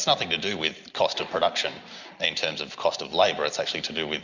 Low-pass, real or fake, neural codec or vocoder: 7.2 kHz; real; none